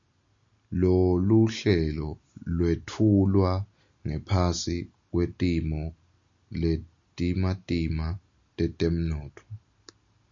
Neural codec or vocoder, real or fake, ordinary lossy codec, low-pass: none; real; MP3, 64 kbps; 7.2 kHz